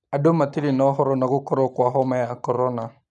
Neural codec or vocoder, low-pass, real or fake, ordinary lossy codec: none; 10.8 kHz; real; none